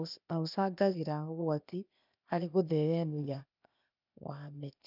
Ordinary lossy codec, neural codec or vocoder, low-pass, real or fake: none; codec, 16 kHz, 0.8 kbps, ZipCodec; 5.4 kHz; fake